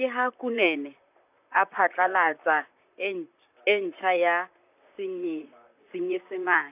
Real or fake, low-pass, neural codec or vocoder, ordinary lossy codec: fake; 3.6 kHz; vocoder, 44.1 kHz, 128 mel bands, Pupu-Vocoder; none